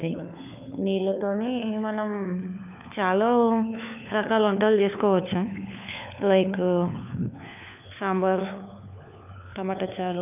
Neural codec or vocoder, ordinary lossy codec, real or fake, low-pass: codec, 16 kHz, 4 kbps, FunCodec, trained on LibriTTS, 50 frames a second; none; fake; 3.6 kHz